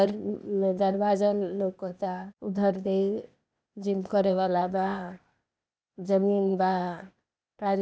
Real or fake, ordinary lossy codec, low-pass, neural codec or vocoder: fake; none; none; codec, 16 kHz, 0.8 kbps, ZipCodec